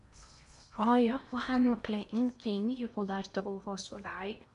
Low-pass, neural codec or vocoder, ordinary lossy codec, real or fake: 10.8 kHz; codec, 16 kHz in and 24 kHz out, 0.6 kbps, FocalCodec, streaming, 4096 codes; none; fake